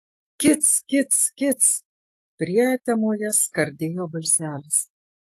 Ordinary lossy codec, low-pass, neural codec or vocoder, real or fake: AAC, 48 kbps; 14.4 kHz; autoencoder, 48 kHz, 128 numbers a frame, DAC-VAE, trained on Japanese speech; fake